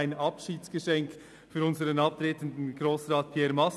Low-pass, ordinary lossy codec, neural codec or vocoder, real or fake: none; none; none; real